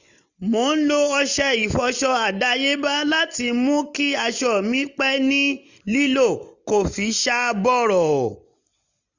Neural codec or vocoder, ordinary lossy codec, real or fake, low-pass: none; none; real; 7.2 kHz